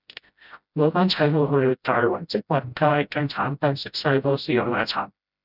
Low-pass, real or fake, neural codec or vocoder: 5.4 kHz; fake; codec, 16 kHz, 0.5 kbps, FreqCodec, smaller model